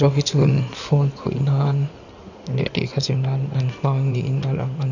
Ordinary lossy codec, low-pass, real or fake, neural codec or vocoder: none; 7.2 kHz; fake; codec, 16 kHz in and 24 kHz out, 2.2 kbps, FireRedTTS-2 codec